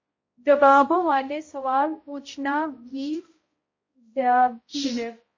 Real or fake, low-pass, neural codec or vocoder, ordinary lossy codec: fake; 7.2 kHz; codec, 16 kHz, 0.5 kbps, X-Codec, HuBERT features, trained on balanced general audio; MP3, 32 kbps